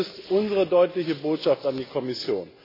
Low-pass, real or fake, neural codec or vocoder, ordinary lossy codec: 5.4 kHz; real; none; AAC, 24 kbps